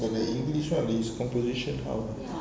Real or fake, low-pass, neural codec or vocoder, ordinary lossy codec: real; none; none; none